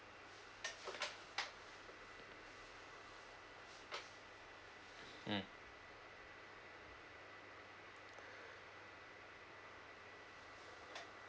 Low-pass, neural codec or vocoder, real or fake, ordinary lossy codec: none; none; real; none